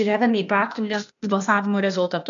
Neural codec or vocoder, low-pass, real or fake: codec, 16 kHz, 0.8 kbps, ZipCodec; 7.2 kHz; fake